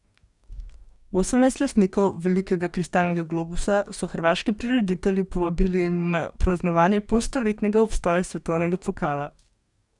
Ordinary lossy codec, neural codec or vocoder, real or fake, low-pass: none; codec, 44.1 kHz, 2.6 kbps, DAC; fake; 10.8 kHz